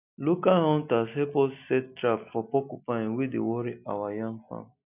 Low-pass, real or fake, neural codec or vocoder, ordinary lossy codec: 3.6 kHz; real; none; none